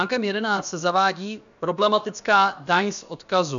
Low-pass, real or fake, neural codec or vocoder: 7.2 kHz; fake; codec, 16 kHz, about 1 kbps, DyCAST, with the encoder's durations